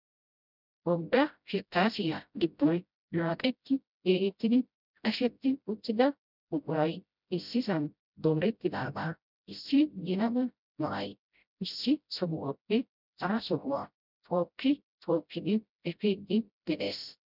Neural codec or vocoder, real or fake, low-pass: codec, 16 kHz, 0.5 kbps, FreqCodec, smaller model; fake; 5.4 kHz